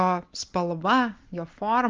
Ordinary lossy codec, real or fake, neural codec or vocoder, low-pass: Opus, 32 kbps; real; none; 7.2 kHz